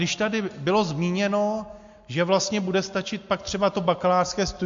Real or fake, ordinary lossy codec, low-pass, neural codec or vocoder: real; MP3, 48 kbps; 7.2 kHz; none